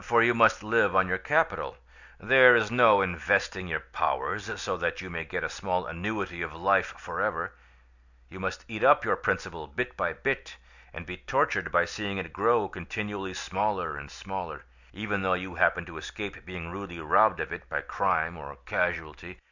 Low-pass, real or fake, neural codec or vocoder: 7.2 kHz; real; none